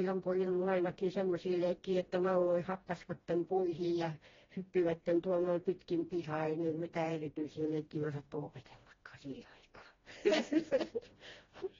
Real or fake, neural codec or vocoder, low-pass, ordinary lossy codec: fake; codec, 16 kHz, 1 kbps, FreqCodec, smaller model; 7.2 kHz; AAC, 24 kbps